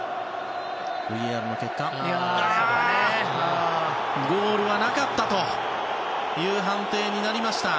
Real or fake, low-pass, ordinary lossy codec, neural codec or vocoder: real; none; none; none